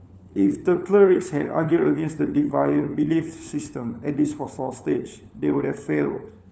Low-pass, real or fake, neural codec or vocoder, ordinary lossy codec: none; fake; codec, 16 kHz, 4 kbps, FunCodec, trained on LibriTTS, 50 frames a second; none